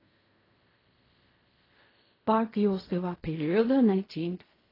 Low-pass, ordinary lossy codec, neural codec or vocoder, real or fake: 5.4 kHz; AAC, 24 kbps; codec, 16 kHz in and 24 kHz out, 0.4 kbps, LongCat-Audio-Codec, fine tuned four codebook decoder; fake